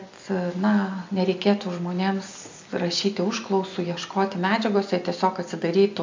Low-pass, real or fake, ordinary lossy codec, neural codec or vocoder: 7.2 kHz; real; MP3, 64 kbps; none